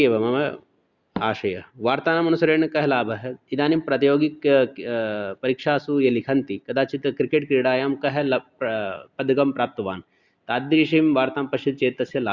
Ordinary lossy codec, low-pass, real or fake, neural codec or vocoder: Opus, 64 kbps; 7.2 kHz; real; none